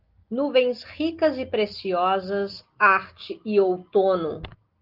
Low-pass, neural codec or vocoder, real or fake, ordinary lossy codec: 5.4 kHz; none; real; Opus, 32 kbps